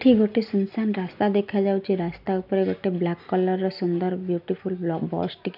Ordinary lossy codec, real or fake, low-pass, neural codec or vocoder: none; real; 5.4 kHz; none